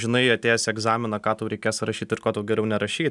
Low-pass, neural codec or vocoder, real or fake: 10.8 kHz; none; real